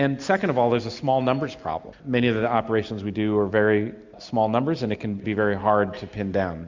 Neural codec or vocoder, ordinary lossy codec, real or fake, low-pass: none; MP3, 64 kbps; real; 7.2 kHz